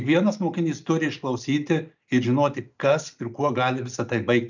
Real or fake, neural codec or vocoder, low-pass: real; none; 7.2 kHz